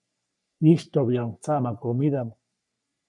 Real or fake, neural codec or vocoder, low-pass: fake; codec, 44.1 kHz, 3.4 kbps, Pupu-Codec; 10.8 kHz